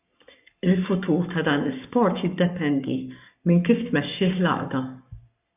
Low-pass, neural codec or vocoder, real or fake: 3.6 kHz; codec, 44.1 kHz, 7.8 kbps, Pupu-Codec; fake